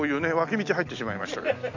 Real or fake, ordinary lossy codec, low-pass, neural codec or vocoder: real; none; 7.2 kHz; none